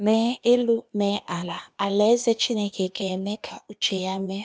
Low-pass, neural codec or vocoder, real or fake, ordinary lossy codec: none; codec, 16 kHz, 0.8 kbps, ZipCodec; fake; none